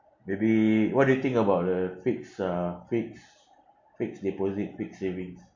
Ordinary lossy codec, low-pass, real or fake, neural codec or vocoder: MP3, 48 kbps; 7.2 kHz; real; none